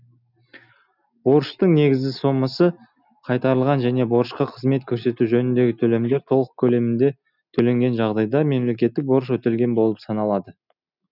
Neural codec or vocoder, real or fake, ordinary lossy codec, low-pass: none; real; none; 5.4 kHz